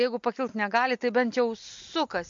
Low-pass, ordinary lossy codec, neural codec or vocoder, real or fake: 7.2 kHz; MP3, 48 kbps; none; real